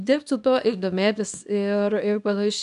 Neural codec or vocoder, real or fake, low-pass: codec, 24 kHz, 0.9 kbps, WavTokenizer, small release; fake; 10.8 kHz